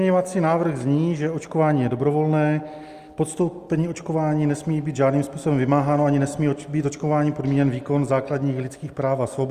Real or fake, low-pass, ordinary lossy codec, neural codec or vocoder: real; 14.4 kHz; Opus, 32 kbps; none